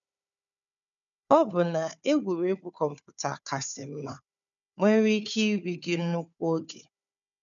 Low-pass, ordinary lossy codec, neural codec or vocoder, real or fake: 7.2 kHz; none; codec, 16 kHz, 4 kbps, FunCodec, trained on Chinese and English, 50 frames a second; fake